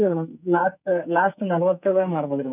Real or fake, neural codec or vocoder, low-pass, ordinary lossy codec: fake; codec, 44.1 kHz, 2.6 kbps, SNAC; 3.6 kHz; none